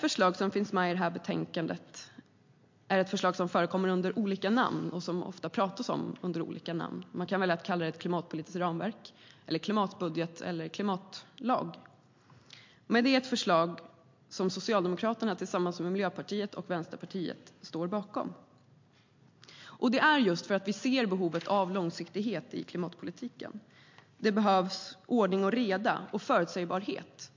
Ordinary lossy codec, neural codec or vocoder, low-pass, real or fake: MP3, 48 kbps; none; 7.2 kHz; real